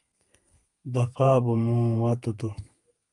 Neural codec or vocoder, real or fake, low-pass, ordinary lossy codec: codec, 32 kHz, 1.9 kbps, SNAC; fake; 10.8 kHz; Opus, 32 kbps